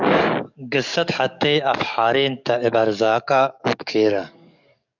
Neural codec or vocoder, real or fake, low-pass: codec, 44.1 kHz, 7.8 kbps, DAC; fake; 7.2 kHz